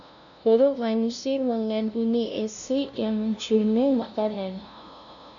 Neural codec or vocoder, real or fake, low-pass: codec, 16 kHz, 0.5 kbps, FunCodec, trained on LibriTTS, 25 frames a second; fake; 7.2 kHz